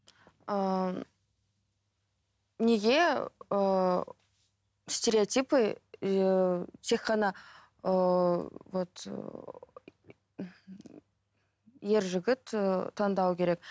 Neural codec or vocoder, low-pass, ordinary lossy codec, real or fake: none; none; none; real